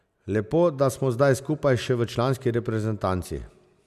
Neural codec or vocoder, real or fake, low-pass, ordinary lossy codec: none; real; 14.4 kHz; none